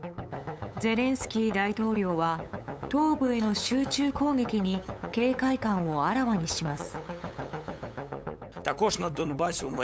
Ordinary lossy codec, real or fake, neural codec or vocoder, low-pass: none; fake; codec, 16 kHz, 8 kbps, FunCodec, trained on LibriTTS, 25 frames a second; none